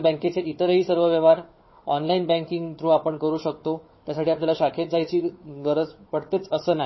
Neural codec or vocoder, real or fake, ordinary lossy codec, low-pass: codec, 16 kHz, 4 kbps, FunCodec, trained on Chinese and English, 50 frames a second; fake; MP3, 24 kbps; 7.2 kHz